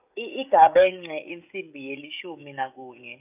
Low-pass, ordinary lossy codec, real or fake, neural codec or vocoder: 3.6 kHz; none; fake; codec, 16 kHz, 16 kbps, FreqCodec, smaller model